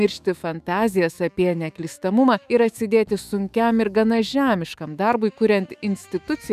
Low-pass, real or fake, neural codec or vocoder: 14.4 kHz; fake; autoencoder, 48 kHz, 128 numbers a frame, DAC-VAE, trained on Japanese speech